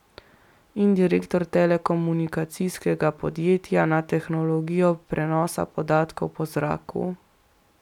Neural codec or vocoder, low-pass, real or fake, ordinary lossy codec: none; 19.8 kHz; real; none